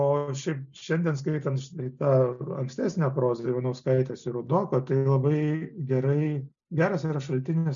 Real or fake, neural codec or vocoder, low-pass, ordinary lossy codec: real; none; 7.2 kHz; MP3, 96 kbps